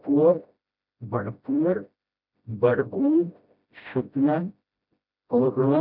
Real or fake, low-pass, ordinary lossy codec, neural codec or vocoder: fake; 5.4 kHz; none; codec, 16 kHz, 0.5 kbps, FreqCodec, smaller model